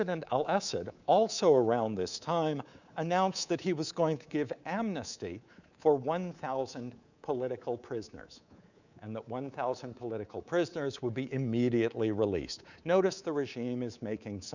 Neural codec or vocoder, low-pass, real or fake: codec, 24 kHz, 3.1 kbps, DualCodec; 7.2 kHz; fake